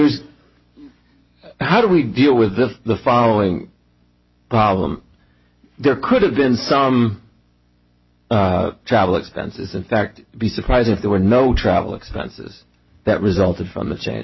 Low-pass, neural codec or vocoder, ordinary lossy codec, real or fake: 7.2 kHz; none; MP3, 24 kbps; real